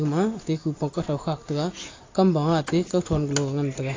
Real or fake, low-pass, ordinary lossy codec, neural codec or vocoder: real; 7.2 kHz; AAC, 32 kbps; none